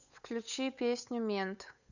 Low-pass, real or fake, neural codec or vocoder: 7.2 kHz; fake; codec, 16 kHz, 8 kbps, FunCodec, trained on Chinese and English, 25 frames a second